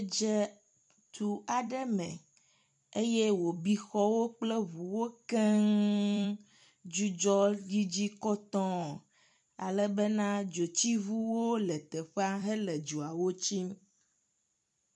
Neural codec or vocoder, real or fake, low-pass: vocoder, 44.1 kHz, 128 mel bands every 256 samples, BigVGAN v2; fake; 10.8 kHz